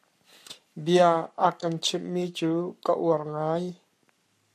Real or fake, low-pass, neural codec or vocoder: fake; 14.4 kHz; codec, 44.1 kHz, 7.8 kbps, Pupu-Codec